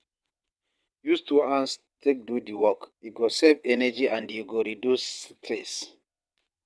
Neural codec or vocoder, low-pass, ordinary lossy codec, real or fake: vocoder, 22.05 kHz, 80 mel bands, WaveNeXt; none; none; fake